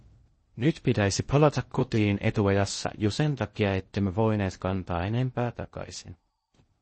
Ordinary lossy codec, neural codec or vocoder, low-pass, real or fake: MP3, 32 kbps; codec, 16 kHz in and 24 kHz out, 0.6 kbps, FocalCodec, streaming, 2048 codes; 10.8 kHz; fake